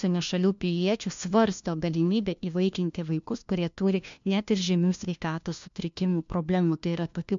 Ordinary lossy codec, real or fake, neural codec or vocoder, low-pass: MP3, 64 kbps; fake; codec, 16 kHz, 1 kbps, FunCodec, trained on LibriTTS, 50 frames a second; 7.2 kHz